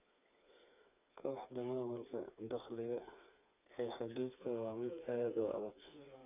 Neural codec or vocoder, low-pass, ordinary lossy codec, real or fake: codec, 16 kHz, 4 kbps, FreqCodec, smaller model; 3.6 kHz; none; fake